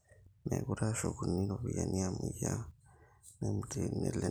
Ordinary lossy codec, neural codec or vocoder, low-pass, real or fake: none; none; none; real